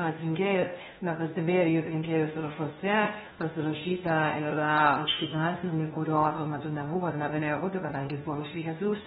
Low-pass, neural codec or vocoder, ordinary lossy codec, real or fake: 7.2 kHz; codec, 16 kHz, 1 kbps, FunCodec, trained on LibriTTS, 50 frames a second; AAC, 16 kbps; fake